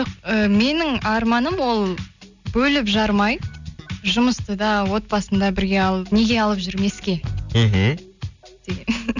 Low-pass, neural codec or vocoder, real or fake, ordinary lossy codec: 7.2 kHz; none; real; none